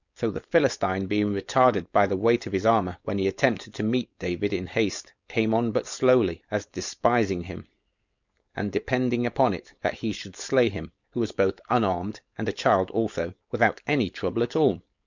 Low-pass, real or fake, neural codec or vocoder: 7.2 kHz; fake; codec, 16 kHz, 4.8 kbps, FACodec